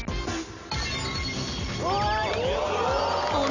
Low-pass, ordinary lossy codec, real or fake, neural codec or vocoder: 7.2 kHz; none; real; none